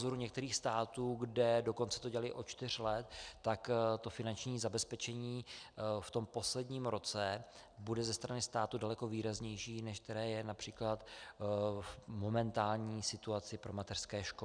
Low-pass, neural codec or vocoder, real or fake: 9.9 kHz; none; real